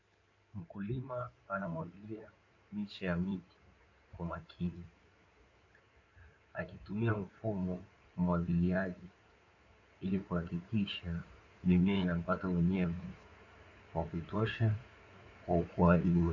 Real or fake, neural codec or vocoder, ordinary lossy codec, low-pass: fake; codec, 16 kHz in and 24 kHz out, 2.2 kbps, FireRedTTS-2 codec; AAC, 48 kbps; 7.2 kHz